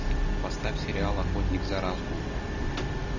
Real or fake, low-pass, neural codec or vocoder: real; 7.2 kHz; none